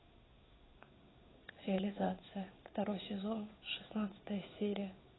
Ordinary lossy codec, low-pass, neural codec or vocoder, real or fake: AAC, 16 kbps; 7.2 kHz; codec, 16 kHz in and 24 kHz out, 1 kbps, XY-Tokenizer; fake